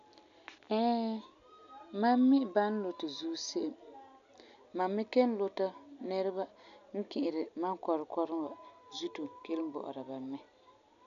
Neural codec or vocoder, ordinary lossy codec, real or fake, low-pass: none; AAC, 96 kbps; real; 7.2 kHz